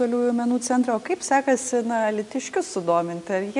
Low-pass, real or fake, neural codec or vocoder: 10.8 kHz; real; none